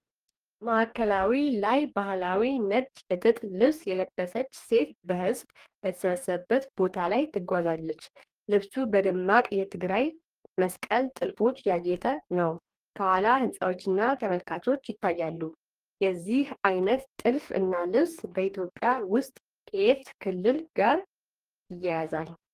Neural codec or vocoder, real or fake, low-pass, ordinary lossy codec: codec, 44.1 kHz, 2.6 kbps, DAC; fake; 14.4 kHz; Opus, 32 kbps